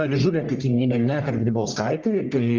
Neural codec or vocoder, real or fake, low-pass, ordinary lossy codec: codec, 44.1 kHz, 1.7 kbps, Pupu-Codec; fake; 7.2 kHz; Opus, 32 kbps